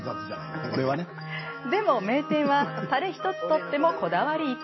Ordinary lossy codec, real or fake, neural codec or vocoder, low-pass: MP3, 24 kbps; real; none; 7.2 kHz